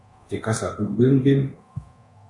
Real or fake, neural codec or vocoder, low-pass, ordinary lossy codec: fake; codec, 24 kHz, 0.9 kbps, DualCodec; 10.8 kHz; MP3, 64 kbps